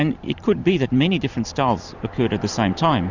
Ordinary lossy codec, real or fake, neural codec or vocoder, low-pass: Opus, 64 kbps; fake; vocoder, 44.1 kHz, 128 mel bands every 512 samples, BigVGAN v2; 7.2 kHz